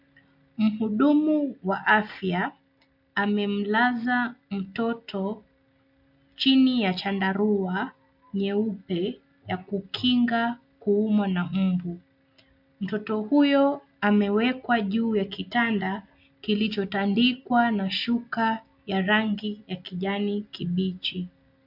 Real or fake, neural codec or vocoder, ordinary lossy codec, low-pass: real; none; MP3, 48 kbps; 5.4 kHz